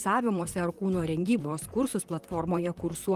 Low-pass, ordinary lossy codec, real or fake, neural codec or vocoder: 14.4 kHz; Opus, 24 kbps; fake; vocoder, 44.1 kHz, 128 mel bands, Pupu-Vocoder